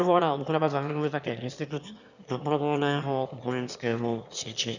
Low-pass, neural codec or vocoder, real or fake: 7.2 kHz; autoencoder, 22.05 kHz, a latent of 192 numbers a frame, VITS, trained on one speaker; fake